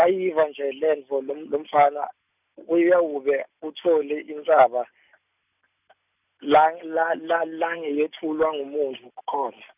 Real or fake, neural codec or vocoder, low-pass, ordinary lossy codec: real; none; 3.6 kHz; none